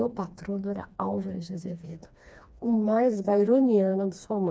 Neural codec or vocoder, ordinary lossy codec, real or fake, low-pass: codec, 16 kHz, 2 kbps, FreqCodec, smaller model; none; fake; none